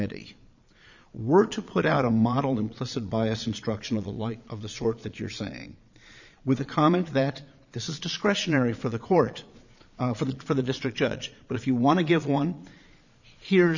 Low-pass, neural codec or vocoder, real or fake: 7.2 kHz; vocoder, 22.05 kHz, 80 mel bands, Vocos; fake